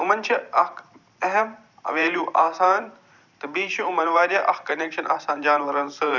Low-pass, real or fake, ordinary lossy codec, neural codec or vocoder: 7.2 kHz; fake; none; vocoder, 44.1 kHz, 128 mel bands every 512 samples, BigVGAN v2